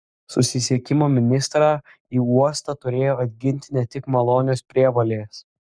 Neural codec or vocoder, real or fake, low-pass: codec, 44.1 kHz, 7.8 kbps, Pupu-Codec; fake; 9.9 kHz